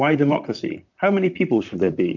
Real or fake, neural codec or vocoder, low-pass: fake; vocoder, 44.1 kHz, 128 mel bands, Pupu-Vocoder; 7.2 kHz